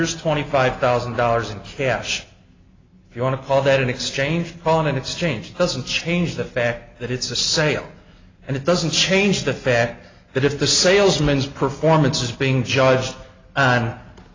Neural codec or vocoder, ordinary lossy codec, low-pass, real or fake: none; AAC, 32 kbps; 7.2 kHz; real